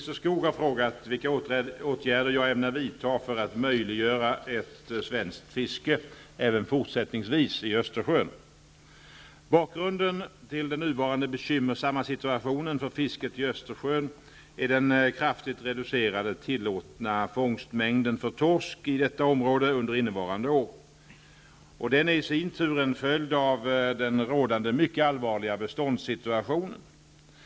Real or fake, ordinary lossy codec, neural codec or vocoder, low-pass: real; none; none; none